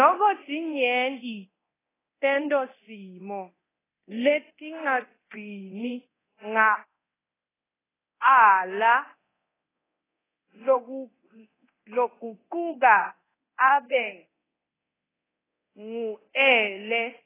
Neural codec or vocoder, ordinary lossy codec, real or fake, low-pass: codec, 24 kHz, 0.9 kbps, DualCodec; AAC, 16 kbps; fake; 3.6 kHz